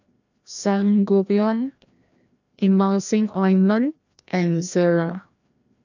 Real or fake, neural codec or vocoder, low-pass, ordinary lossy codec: fake; codec, 16 kHz, 1 kbps, FreqCodec, larger model; 7.2 kHz; none